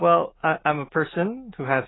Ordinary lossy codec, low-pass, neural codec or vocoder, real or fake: AAC, 16 kbps; 7.2 kHz; none; real